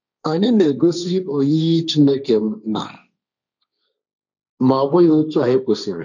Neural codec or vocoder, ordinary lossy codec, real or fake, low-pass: codec, 16 kHz, 1.1 kbps, Voila-Tokenizer; none; fake; 7.2 kHz